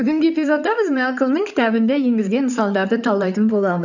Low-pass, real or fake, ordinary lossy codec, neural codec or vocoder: 7.2 kHz; fake; none; codec, 16 kHz in and 24 kHz out, 2.2 kbps, FireRedTTS-2 codec